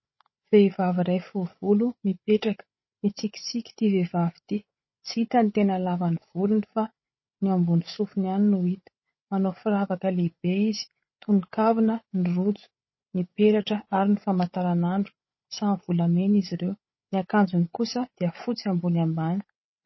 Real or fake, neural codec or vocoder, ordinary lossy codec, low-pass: fake; codec, 16 kHz, 8 kbps, FreqCodec, larger model; MP3, 24 kbps; 7.2 kHz